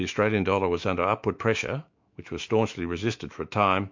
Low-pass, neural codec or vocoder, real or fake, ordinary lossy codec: 7.2 kHz; autoencoder, 48 kHz, 128 numbers a frame, DAC-VAE, trained on Japanese speech; fake; MP3, 48 kbps